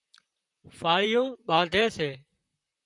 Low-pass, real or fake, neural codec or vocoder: 10.8 kHz; fake; vocoder, 44.1 kHz, 128 mel bands, Pupu-Vocoder